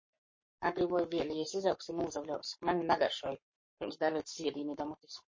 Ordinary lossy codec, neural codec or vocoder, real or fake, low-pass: MP3, 32 kbps; codec, 44.1 kHz, 7.8 kbps, Pupu-Codec; fake; 7.2 kHz